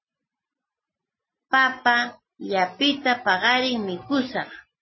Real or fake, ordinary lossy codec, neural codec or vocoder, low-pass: real; MP3, 24 kbps; none; 7.2 kHz